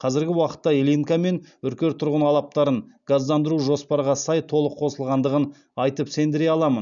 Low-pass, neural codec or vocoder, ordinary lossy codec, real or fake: 7.2 kHz; none; none; real